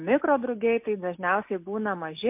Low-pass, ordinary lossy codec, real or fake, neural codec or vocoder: 3.6 kHz; MP3, 32 kbps; real; none